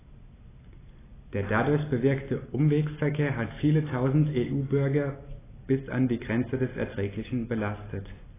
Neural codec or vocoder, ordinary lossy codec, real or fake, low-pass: none; AAC, 16 kbps; real; 3.6 kHz